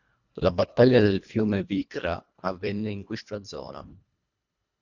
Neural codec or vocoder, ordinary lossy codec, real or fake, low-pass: codec, 24 kHz, 1.5 kbps, HILCodec; Opus, 64 kbps; fake; 7.2 kHz